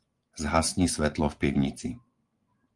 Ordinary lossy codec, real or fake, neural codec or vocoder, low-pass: Opus, 32 kbps; fake; vocoder, 24 kHz, 100 mel bands, Vocos; 10.8 kHz